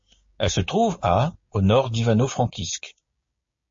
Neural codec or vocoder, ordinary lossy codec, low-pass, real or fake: codec, 16 kHz, 6 kbps, DAC; MP3, 32 kbps; 7.2 kHz; fake